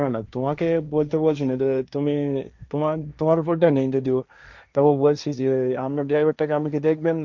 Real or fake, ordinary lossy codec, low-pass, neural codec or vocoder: fake; none; 7.2 kHz; codec, 16 kHz, 1.1 kbps, Voila-Tokenizer